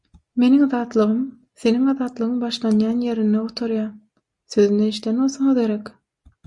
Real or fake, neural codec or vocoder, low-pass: real; none; 10.8 kHz